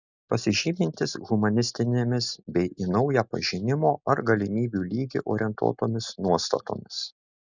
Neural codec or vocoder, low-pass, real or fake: none; 7.2 kHz; real